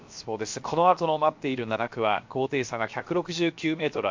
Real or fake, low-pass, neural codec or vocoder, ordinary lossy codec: fake; 7.2 kHz; codec, 16 kHz, 0.7 kbps, FocalCodec; MP3, 48 kbps